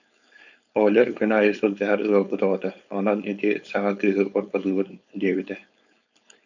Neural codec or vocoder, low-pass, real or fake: codec, 16 kHz, 4.8 kbps, FACodec; 7.2 kHz; fake